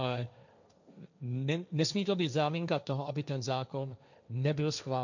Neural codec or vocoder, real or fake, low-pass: codec, 16 kHz, 1.1 kbps, Voila-Tokenizer; fake; 7.2 kHz